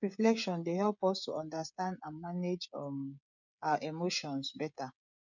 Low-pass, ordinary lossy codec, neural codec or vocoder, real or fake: 7.2 kHz; none; codec, 16 kHz, 16 kbps, FreqCodec, smaller model; fake